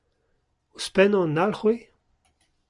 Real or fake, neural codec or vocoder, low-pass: real; none; 10.8 kHz